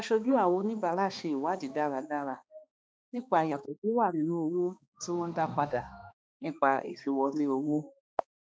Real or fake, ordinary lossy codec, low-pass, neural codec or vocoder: fake; none; none; codec, 16 kHz, 2 kbps, X-Codec, HuBERT features, trained on balanced general audio